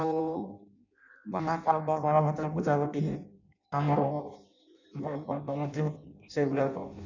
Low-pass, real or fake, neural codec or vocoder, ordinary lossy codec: 7.2 kHz; fake; codec, 16 kHz in and 24 kHz out, 0.6 kbps, FireRedTTS-2 codec; none